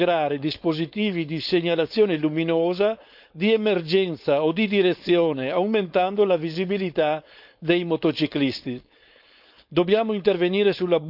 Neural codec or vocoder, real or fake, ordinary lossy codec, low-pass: codec, 16 kHz, 4.8 kbps, FACodec; fake; none; 5.4 kHz